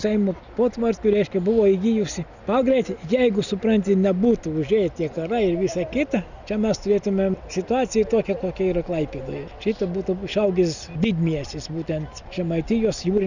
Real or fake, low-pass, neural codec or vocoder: real; 7.2 kHz; none